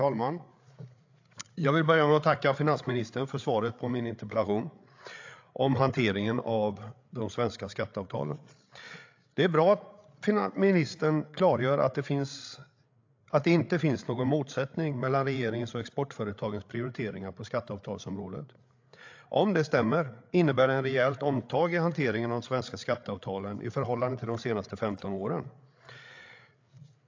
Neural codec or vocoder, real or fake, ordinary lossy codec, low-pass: codec, 16 kHz, 8 kbps, FreqCodec, larger model; fake; AAC, 48 kbps; 7.2 kHz